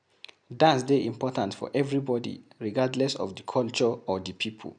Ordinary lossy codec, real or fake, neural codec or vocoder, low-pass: none; real; none; 9.9 kHz